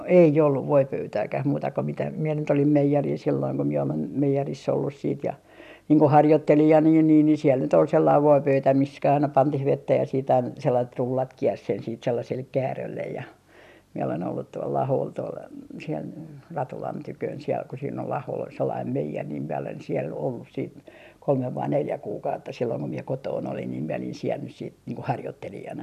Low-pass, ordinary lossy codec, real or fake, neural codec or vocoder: 14.4 kHz; none; real; none